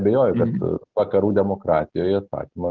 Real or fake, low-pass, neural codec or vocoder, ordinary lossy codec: real; 7.2 kHz; none; Opus, 16 kbps